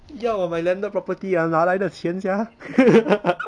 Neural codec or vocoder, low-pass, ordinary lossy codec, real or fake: none; 9.9 kHz; none; real